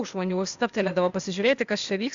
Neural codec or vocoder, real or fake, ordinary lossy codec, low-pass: codec, 16 kHz, 0.8 kbps, ZipCodec; fake; Opus, 64 kbps; 7.2 kHz